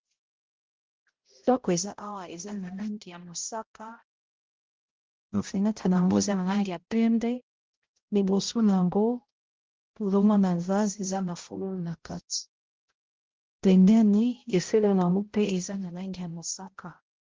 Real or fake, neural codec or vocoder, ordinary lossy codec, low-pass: fake; codec, 16 kHz, 0.5 kbps, X-Codec, HuBERT features, trained on balanced general audio; Opus, 16 kbps; 7.2 kHz